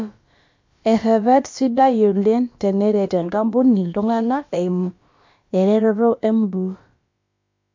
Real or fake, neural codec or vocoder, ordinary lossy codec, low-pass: fake; codec, 16 kHz, about 1 kbps, DyCAST, with the encoder's durations; MP3, 48 kbps; 7.2 kHz